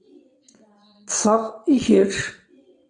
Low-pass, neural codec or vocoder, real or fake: 9.9 kHz; vocoder, 22.05 kHz, 80 mel bands, WaveNeXt; fake